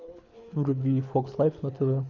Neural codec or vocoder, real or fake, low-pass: codec, 24 kHz, 6 kbps, HILCodec; fake; 7.2 kHz